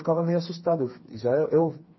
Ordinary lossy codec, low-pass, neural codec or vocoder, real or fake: MP3, 24 kbps; 7.2 kHz; codec, 16 kHz, 4 kbps, FreqCodec, smaller model; fake